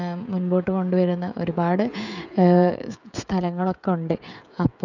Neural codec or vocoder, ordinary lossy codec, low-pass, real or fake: codec, 16 kHz, 16 kbps, FreqCodec, smaller model; none; 7.2 kHz; fake